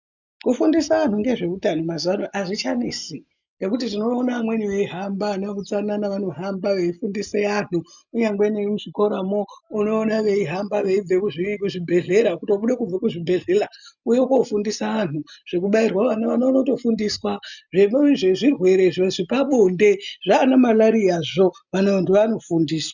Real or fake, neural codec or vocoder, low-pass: real; none; 7.2 kHz